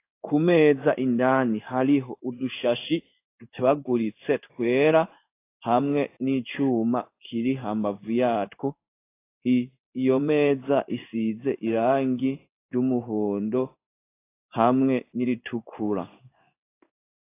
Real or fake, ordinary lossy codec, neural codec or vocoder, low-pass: fake; AAC, 24 kbps; codec, 16 kHz in and 24 kHz out, 1 kbps, XY-Tokenizer; 3.6 kHz